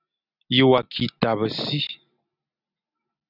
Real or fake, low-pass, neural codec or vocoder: real; 5.4 kHz; none